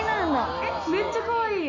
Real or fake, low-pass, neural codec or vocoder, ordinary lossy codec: real; 7.2 kHz; none; none